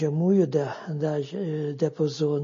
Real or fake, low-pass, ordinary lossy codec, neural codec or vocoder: real; 7.2 kHz; MP3, 32 kbps; none